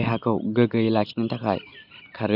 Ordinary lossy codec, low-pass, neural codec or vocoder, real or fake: none; 5.4 kHz; none; real